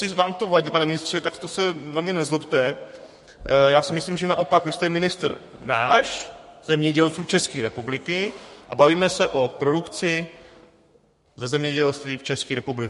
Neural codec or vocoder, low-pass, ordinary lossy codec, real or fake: codec, 32 kHz, 1.9 kbps, SNAC; 14.4 kHz; MP3, 48 kbps; fake